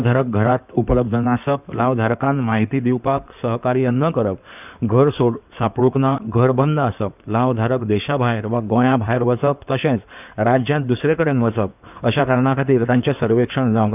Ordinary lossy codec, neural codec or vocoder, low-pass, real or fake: none; codec, 16 kHz in and 24 kHz out, 2.2 kbps, FireRedTTS-2 codec; 3.6 kHz; fake